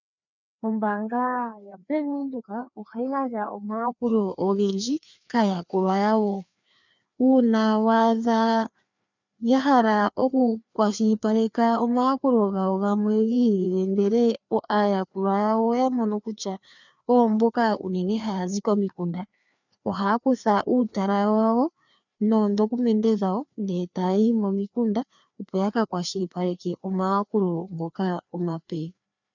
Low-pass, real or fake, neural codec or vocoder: 7.2 kHz; fake; codec, 16 kHz, 2 kbps, FreqCodec, larger model